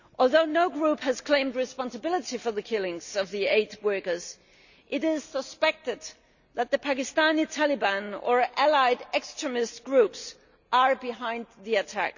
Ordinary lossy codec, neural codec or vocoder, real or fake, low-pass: none; none; real; 7.2 kHz